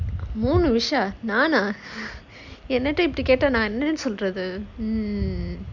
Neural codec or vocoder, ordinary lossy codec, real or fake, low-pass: none; none; real; 7.2 kHz